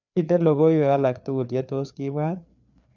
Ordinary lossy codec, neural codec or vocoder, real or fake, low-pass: none; codec, 16 kHz, 4 kbps, FreqCodec, larger model; fake; 7.2 kHz